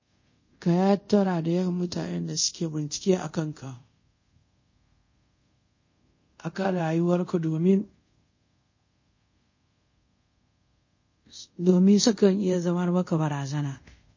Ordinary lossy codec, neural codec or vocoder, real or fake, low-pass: MP3, 32 kbps; codec, 24 kHz, 0.5 kbps, DualCodec; fake; 7.2 kHz